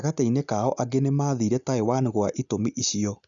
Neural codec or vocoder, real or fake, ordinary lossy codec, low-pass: none; real; none; 7.2 kHz